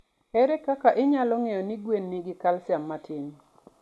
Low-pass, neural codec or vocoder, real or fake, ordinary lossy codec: none; none; real; none